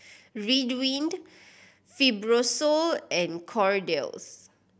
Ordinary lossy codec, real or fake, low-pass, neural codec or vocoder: none; real; none; none